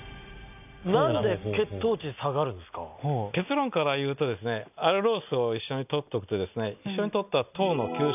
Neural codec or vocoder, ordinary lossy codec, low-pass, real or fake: none; none; 3.6 kHz; real